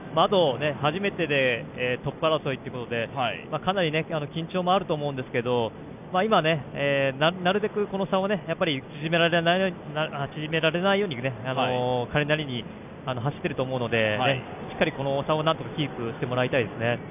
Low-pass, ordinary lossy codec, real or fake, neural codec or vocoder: 3.6 kHz; none; real; none